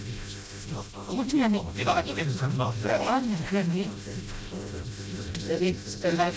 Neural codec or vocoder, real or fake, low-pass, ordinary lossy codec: codec, 16 kHz, 0.5 kbps, FreqCodec, smaller model; fake; none; none